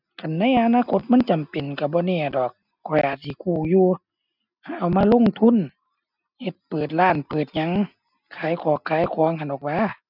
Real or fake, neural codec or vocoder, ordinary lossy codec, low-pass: real; none; none; 5.4 kHz